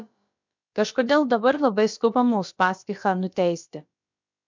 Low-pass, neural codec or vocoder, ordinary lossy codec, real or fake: 7.2 kHz; codec, 16 kHz, about 1 kbps, DyCAST, with the encoder's durations; MP3, 64 kbps; fake